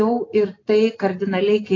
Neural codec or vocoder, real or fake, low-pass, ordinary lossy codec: none; real; 7.2 kHz; AAC, 32 kbps